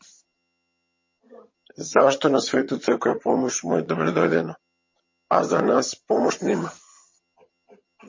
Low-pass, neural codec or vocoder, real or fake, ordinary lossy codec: 7.2 kHz; vocoder, 22.05 kHz, 80 mel bands, HiFi-GAN; fake; MP3, 32 kbps